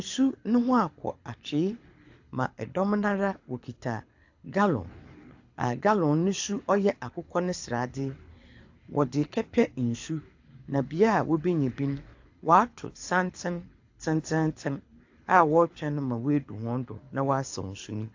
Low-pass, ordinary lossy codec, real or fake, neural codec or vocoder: 7.2 kHz; AAC, 48 kbps; fake; codec, 24 kHz, 6 kbps, HILCodec